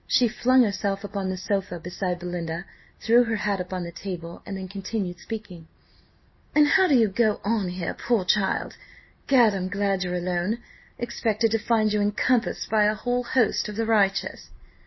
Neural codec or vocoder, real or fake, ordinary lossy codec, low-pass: none; real; MP3, 24 kbps; 7.2 kHz